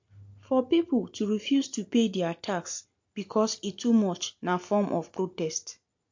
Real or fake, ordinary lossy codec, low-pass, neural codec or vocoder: real; MP3, 48 kbps; 7.2 kHz; none